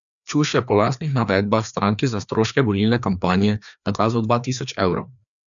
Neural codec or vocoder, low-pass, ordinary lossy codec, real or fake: codec, 16 kHz, 2 kbps, FreqCodec, larger model; 7.2 kHz; none; fake